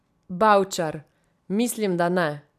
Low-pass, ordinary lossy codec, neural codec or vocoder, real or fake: 14.4 kHz; none; vocoder, 44.1 kHz, 128 mel bands every 256 samples, BigVGAN v2; fake